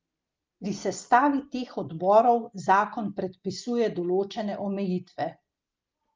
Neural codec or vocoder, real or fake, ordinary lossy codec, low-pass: none; real; Opus, 32 kbps; 7.2 kHz